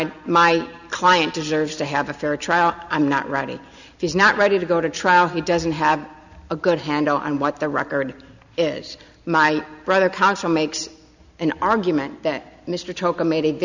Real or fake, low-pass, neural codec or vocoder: real; 7.2 kHz; none